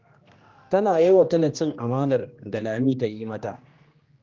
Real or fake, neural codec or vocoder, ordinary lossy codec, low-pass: fake; codec, 16 kHz, 1 kbps, X-Codec, HuBERT features, trained on general audio; Opus, 32 kbps; 7.2 kHz